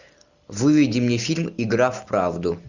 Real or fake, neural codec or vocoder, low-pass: real; none; 7.2 kHz